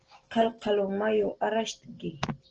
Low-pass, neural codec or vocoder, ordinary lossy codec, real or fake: 7.2 kHz; none; Opus, 16 kbps; real